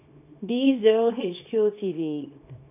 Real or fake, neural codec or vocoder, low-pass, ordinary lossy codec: fake; codec, 24 kHz, 0.9 kbps, WavTokenizer, small release; 3.6 kHz; none